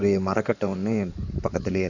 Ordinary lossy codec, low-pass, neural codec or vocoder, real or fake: none; 7.2 kHz; codec, 16 kHz in and 24 kHz out, 2.2 kbps, FireRedTTS-2 codec; fake